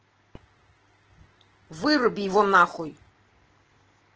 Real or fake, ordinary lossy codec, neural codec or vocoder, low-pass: real; Opus, 16 kbps; none; 7.2 kHz